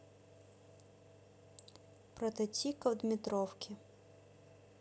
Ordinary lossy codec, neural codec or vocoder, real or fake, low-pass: none; none; real; none